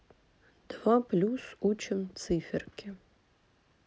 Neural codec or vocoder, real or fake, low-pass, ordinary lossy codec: none; real; none; none